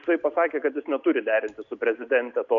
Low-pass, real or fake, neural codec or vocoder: 7.2 kHz; real; none